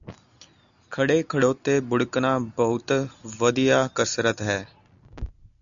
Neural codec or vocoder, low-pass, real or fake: none; 7.2 kHz; real